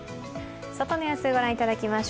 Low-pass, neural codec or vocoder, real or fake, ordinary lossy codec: none; none; real; none